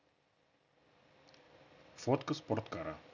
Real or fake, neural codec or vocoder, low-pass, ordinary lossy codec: real; none; 7.2 kHz; none